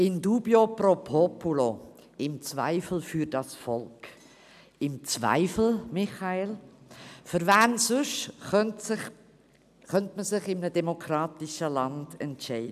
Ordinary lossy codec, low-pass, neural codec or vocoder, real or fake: none; 14.4 kHz; none; real